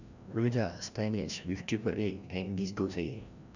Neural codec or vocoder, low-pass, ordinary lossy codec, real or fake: codec, 16 kHz, 1 kbps, FreqCodec, larger model; 7.2 kHz; none; fake